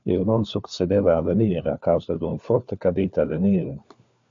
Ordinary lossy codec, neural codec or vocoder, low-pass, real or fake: AAC, 64 kbps; codec, 16 kHz, 4 kbps, FunCodec, trained on LibriTTS, 50 frames a second; 7.2 kHz; fake